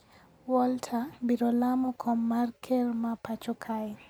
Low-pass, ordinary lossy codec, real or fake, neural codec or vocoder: none; none; real; none